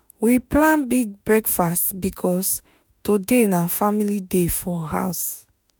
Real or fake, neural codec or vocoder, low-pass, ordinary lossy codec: fake; autoencoder, 48 kHz, 32 numbers a frame, DAC-VAE, trained on Japanese speech; none; none